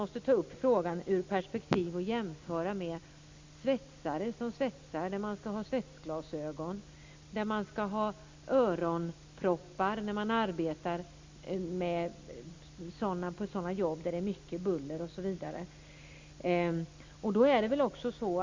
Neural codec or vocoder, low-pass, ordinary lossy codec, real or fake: none; 7.2 kHz; MP3, 64 kbps; real